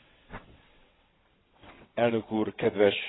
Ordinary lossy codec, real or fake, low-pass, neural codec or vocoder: AAC, 16 kbps; fake; 7.2 kHz; vocoder, 22.05 kHz, 80 mel bands, WaveNeXt